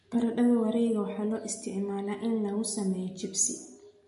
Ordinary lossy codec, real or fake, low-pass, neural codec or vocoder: MP3, 48 kbps; real; 10.8 kHz; none